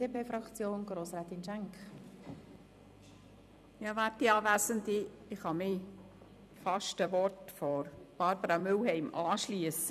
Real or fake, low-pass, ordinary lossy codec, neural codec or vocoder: fake; 14.4 kHz; none; vocoder, 44.1 kHz, 128 mel bands every 256 samples, BigVGAN v2